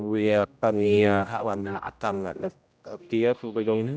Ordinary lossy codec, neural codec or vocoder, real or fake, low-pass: none; codec, 16 kHz, 0.5 kbps, X-Codec, HuBERT features, trained on general audio; fake; none